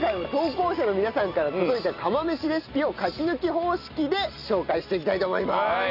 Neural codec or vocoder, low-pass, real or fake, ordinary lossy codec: none; 5.4 kHz; real; none